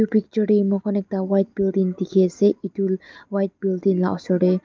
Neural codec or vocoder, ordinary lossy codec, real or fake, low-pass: none; Opus, 24 kbps; real; 7.2 kHz